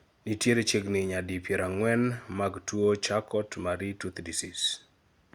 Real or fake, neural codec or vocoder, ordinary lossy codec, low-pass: real; none; Opus, 64 kbps; 19.8 kHz